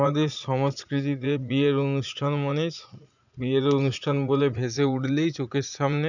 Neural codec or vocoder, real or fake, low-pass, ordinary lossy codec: vocoder, 44.1 kHz, 80 mel bands, Vocos; fake; 7.2 kHz; none